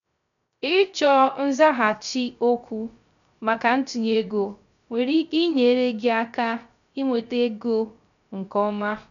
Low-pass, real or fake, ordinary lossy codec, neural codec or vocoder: 7.2 kHz; fake; none; codec, 16 kHz, 0.3 kbps, FocalCodec